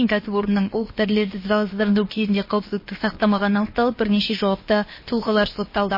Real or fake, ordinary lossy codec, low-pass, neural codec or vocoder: fake; MP3, 24 kbps; 5.4 kHz; codec, 16 kHz, about 1 kbps, DyCAST, with the encoder's durations